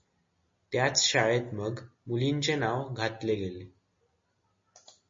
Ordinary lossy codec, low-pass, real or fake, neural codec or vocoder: MP3, 32 kbps; 7.2 kHz; real; none